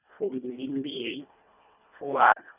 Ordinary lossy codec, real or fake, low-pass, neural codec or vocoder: none; fake; 3.6 kHz; codec, 24 kHz, 1.5 kbps, HILCodec